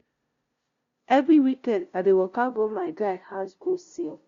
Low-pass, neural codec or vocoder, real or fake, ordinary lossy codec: 7.2 kHz; codec, 16 kHz, 0.5 kbps, FunCodec, trained on LibriTTS, 25 frames a second; fake; Opus, 64 kbps